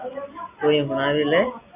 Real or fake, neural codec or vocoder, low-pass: real; none; 3.6 kHz